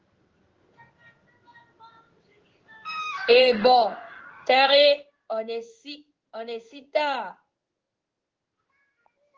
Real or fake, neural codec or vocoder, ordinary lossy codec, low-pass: fake; codec, 16 kHz in and 24 kHz out, 1 kbps, XY-Tokenizer; Opus, 16 kbps; 7.2 kHz